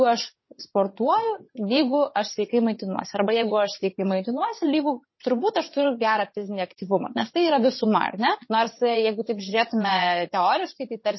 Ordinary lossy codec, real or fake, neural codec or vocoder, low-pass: MP3, 24 kbps; fake; vocoder, 22.05 kHz, 80 mel bands, WaveNeXt; 7.2 kHz